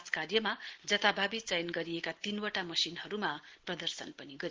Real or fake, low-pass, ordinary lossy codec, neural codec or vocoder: real; 7.2 kHz; Opus, 16 kbps; none